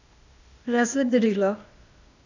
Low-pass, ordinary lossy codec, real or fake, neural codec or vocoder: 7.2 kHz; none; fake; codec, 16 kHz, 0.8 kbps, ZipCodec